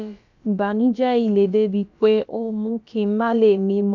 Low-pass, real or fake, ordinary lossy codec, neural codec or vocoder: 7.2 kHz; fake; none; codec, 16 kHz, about 1 kbps, DyCAST, with the encoder's durations